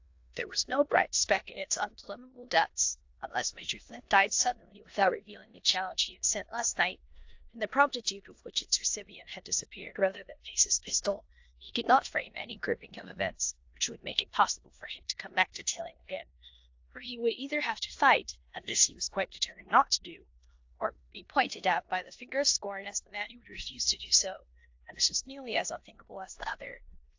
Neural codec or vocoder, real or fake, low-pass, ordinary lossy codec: codec, 16 kHz in and 24 kHz out, 0.9 kbps, LongCat-Audio-Codec, four codebook decoder; fake; 7.2 kHz; AAC, 48 kbps